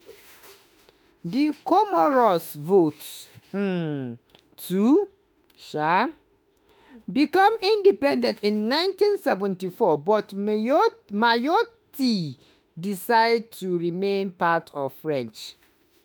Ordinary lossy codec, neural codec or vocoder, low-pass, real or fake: none; autoencoder, 48 kHz, 32 numbers a frame, DAC-VAE, trained on Japanese speech; none; fake